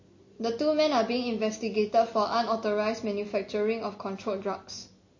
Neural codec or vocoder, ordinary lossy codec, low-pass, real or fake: none; MP3, 32 kbps; 7.2 kHz; real